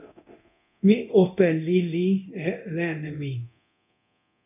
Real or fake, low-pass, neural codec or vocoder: fake; 3.6 kHz; codec, 24 kHz, 0.9 kbps, DualCodec